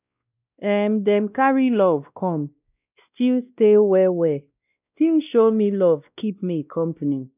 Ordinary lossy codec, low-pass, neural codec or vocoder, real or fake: none; 3.6 kHz; codec, 16 kHz, 1 kbps, X-Codec, WavLM features, trained on Multilingual LibriSpeech; fake